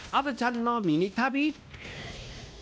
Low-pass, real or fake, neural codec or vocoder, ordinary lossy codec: none; fake; codec, 16 kHz, 1 kbps, X-Codec, WavLM features, trained on Multilingual LibriSpeech; none